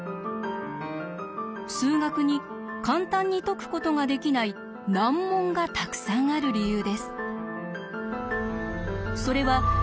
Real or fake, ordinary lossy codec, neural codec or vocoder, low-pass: real; none; none; none